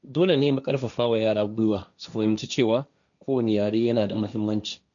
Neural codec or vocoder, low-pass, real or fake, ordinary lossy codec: codec, 16 kHz, 1.1 kbps, Voila-Tokenizer; 7.2 kHz; fake; none